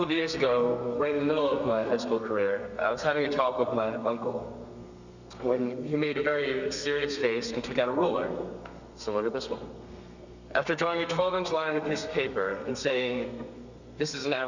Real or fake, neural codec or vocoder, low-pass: fake; codec, 32 kHz, 1.9 kbps, SNAC; 7.2 kHz